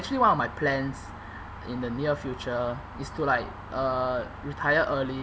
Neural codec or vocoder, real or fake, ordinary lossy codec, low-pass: none; real; none; none